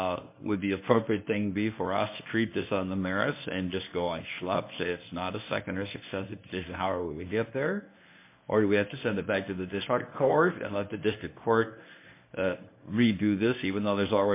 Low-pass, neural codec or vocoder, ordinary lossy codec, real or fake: 3.6 kHz; codec, 24 kHz, 0.9 kbps, WavTokenizer, medium speech release version 1; MP3, 24 kbps; fake